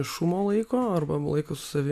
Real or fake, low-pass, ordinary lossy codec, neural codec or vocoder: real; 14.4 kHz; AAC, 96 kbps; none